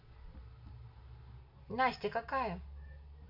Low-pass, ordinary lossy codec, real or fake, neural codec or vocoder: 5.4 kHz; MP3, 32 kbps; real; none